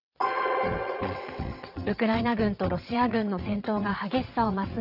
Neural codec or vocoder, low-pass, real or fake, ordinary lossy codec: vocoder, 22.05 kHz, 80 mel bands, WaveNeXt; 5.4 kHz; fake; none